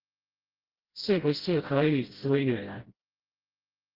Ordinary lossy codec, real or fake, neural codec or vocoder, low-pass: Opus, 16 kbps; fake; codec, 16 kHz, 0.5 kbps, FreqCodec, smaller model; 5.4 kHz